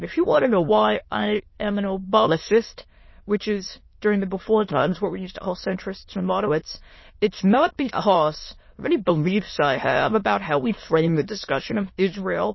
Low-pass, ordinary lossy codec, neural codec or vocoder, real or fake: 7.2 kHz; MP3, 24 kbps; autoencoder, 22.05 kHz, a latent of 192 numbers a frame, VITS, trained on many speakers; fake